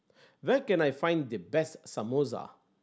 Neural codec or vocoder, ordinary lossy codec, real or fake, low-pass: none; none; real; none